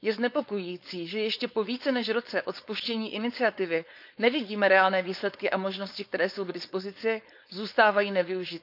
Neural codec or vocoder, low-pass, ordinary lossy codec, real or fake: codec, 16 kHz, 4.8 kbps, FACodec; 5.4 kHz; none; fake